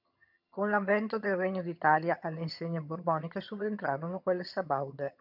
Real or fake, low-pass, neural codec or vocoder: fake; 5.4 kHz; vocoder, 22.05 kHz, 80 mel bands, HiFi-GAN